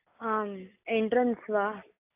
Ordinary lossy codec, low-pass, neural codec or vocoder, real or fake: AAC, 32 kbps; 3.6 kHz; none; real